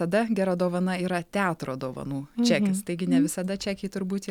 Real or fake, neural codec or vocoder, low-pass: real; none; 19.8 kHz